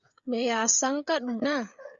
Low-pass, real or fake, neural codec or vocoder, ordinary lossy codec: 7.2 kHz; fake; codec, 16 kHz, 16 kbps, FreqCodec, smaller model; Opus, 64 kbps